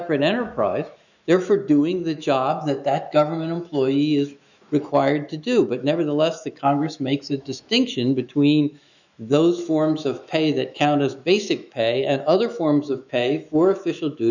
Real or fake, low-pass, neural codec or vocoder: fake; 7.2 kHz; autoencoder, 48 kHz, 128 numbers a frame, DAC-VAE, trained on Japanese speech